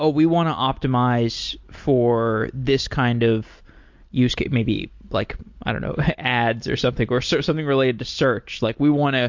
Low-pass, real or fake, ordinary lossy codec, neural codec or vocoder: 7.2 kHz; real; MP3, 48 kbps; none